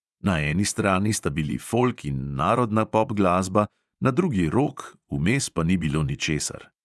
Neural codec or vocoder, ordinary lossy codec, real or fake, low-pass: vocoder, 24 kHz, 100 mel bands, Vocos; none; fake; none